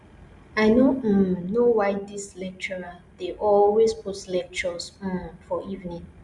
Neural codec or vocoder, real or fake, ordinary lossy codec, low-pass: none; real; Opus, 64 kbps; 10.8 kHz